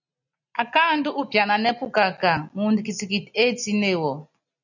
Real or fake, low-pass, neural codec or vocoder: real; 7.2 kHz; none